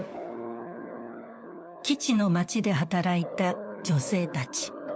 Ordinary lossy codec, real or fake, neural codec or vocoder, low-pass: none; fake; codec, 16 kHz, 4 kbps, FunCodec, trained on LibriTTS, 50 frames a second; none